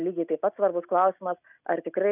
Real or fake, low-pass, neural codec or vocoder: real; 3.6 kHz; none